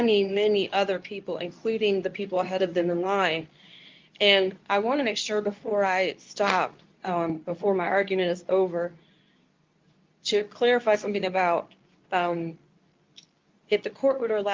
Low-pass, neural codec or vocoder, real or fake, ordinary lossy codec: 7.2 kHz; codec, 24 kHz, 0.9 kbps, WavTokenizer, medium speech release version 2; fake; Opus, 24 kbps